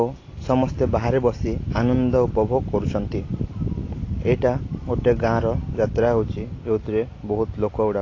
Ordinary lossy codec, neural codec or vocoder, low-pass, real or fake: AAC, 32 kbps; none; 7.2 kHz; real